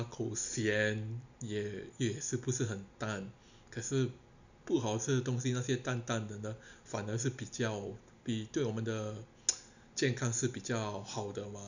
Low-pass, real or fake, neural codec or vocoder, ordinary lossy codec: 7.2 kHz; real; none; none